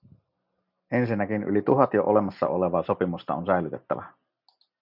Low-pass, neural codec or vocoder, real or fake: 5.4 kHz; none; real